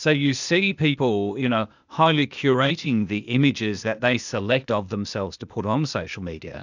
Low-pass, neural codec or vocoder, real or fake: 7.2 kHz; codec, 16 kHz, 0.8 kbps, ZipCodec; fake